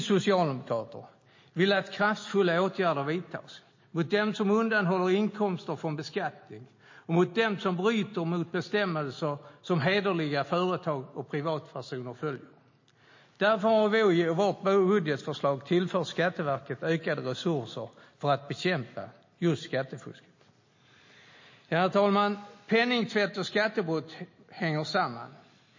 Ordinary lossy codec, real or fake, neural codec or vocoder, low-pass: MP3, 32 kbps; real; none; 7.2 kHz